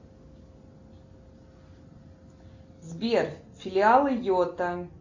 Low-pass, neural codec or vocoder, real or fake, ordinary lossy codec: 7.2 kHz; none; real; MP3, 64 kbps